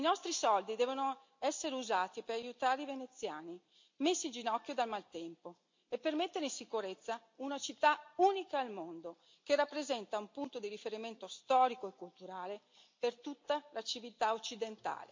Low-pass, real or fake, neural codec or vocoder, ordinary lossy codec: 7.2 kHz; real; none; MP3, 48 kbps